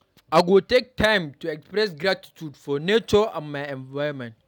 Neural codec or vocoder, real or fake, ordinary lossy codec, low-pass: none; real; none; 19.8 kHz